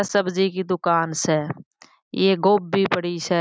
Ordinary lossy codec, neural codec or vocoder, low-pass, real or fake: none; none; none; real